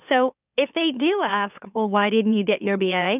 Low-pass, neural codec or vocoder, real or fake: 3.6 kHz; autoencoder, 44.1 kHz, a latent of 192 numbers a frame, MeloTTS; fake